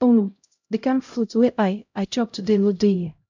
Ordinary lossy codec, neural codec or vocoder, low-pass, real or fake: MP3, 64 kbps; codec, 16 kHz, 0.5 kbps, X-Codec, HuBERT features, trained on LibriSpeech; 7.2 kHz; fake